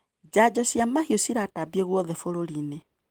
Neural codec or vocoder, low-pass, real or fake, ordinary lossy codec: none; 19.8 kHz; real; Opus, 24 kbps